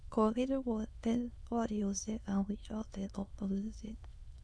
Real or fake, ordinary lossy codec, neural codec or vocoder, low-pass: fake; none; autoencoder, 22.05 kHz, a latent of 192 numbers a frame, VITS, trained on many speakers; none